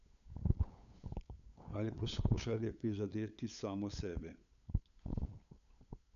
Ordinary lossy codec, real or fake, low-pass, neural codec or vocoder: none; fake; 7.2 kHz; codec, 16 kHz, 8 kbps, FunCodec, trained on LibriTTS, 25 frames a second